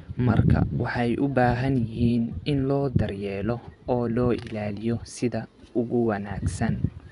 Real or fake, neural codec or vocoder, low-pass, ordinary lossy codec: fake; vocoder, 24 kHz, 100 mel bands, Vocos; 10.8 kHz; none